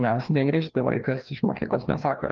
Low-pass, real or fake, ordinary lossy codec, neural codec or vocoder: 7.2 kHz; fake; Opus, 32 kbps; codec, 16 kHz, 1 kbps, FreqCodec, larger model